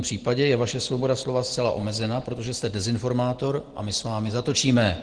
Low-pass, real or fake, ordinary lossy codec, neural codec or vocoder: 9.9 kHz; real; Opus, 16 kbps; none